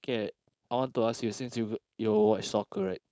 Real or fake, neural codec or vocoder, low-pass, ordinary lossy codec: fake; codec, 16 kHz, 4.8 kbps, FACodec; none; none